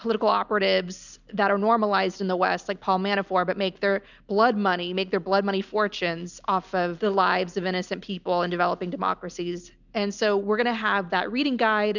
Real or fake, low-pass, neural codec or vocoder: real; 7.2 kHz; none